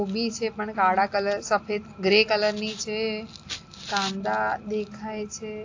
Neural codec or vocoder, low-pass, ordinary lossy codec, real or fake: none; 7.2 kHz; AAC, 48 kbps; real